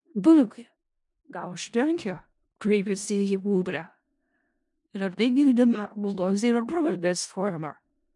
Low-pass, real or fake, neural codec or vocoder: 10.8 kHz; fake; codec, 16 kHz in and 24 kHz out, 0.4 kbps, LongCat-Audio-Codec, four codebook decoder